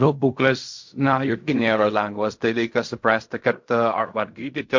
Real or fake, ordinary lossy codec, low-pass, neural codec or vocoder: fake; MP3, 48 kbps; 7.2 kHz; codec, 16 kHz in and 24 kHz out, 0.4 kbps, LongCat-Audio-Codec, fine tuned four codebook decoder